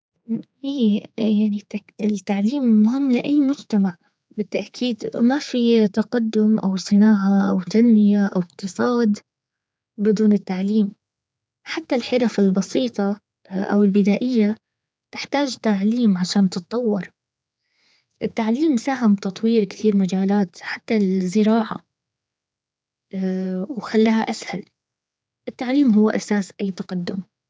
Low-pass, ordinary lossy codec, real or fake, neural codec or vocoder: none; none; fake; codec, 16 kHz, 4 kbps, X-Codec, HuBERT features, trained on general audio